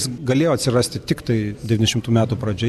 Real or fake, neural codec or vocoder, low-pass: real; none; 14.4 kHz